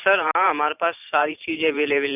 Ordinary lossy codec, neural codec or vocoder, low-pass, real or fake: none; vocoder, 44.1 kHz, 128 mel bands every 512 samples, BigVGAN v2; 3.6 kHz; fake